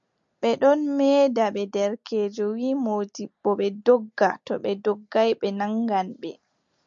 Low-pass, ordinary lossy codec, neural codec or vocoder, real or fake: 7.2 kHz; MP3, 64 kbps; none; real